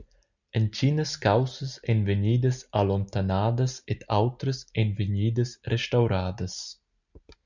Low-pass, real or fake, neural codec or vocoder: 7.2 kHz; real; none